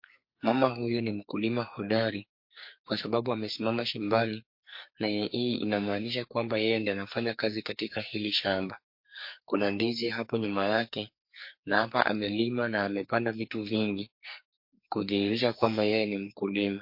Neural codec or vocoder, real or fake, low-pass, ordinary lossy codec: codec, 44.1 kHz, 2.6 kbps, SNAC; fake; 5.4 kHz; MP3, 32 kbps